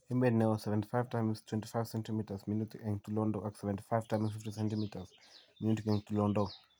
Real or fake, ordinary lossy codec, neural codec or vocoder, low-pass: real; none; none; none